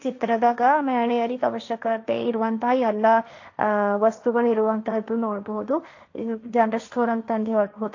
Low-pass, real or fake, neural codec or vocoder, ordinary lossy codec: 7.2 kHz; fake; codec, 16 kHz, 1.1 kbps, Voila-Tokenizer; AAC, 48 kbps